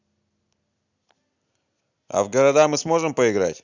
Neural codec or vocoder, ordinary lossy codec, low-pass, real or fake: none; none; 7.2 kHz; real